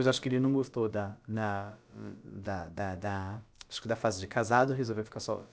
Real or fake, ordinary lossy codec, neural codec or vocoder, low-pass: fake; none; codec, 16 kHz, about 1 kbps, DyCAST, with the encoder's durations; none